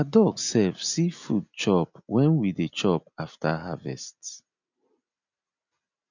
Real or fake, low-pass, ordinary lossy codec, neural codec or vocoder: real; 7.2 kHz; none; none